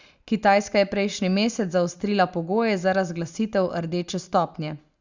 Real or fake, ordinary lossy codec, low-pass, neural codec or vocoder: real; Opus, 64 kbps; 7.2 kHz; none